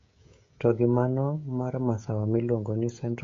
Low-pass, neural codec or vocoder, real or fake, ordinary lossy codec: 7.2 kHz; codec, 16 kHz, 16 kbps, FreqCodec, smaller model; fake; MP3, 48 kbps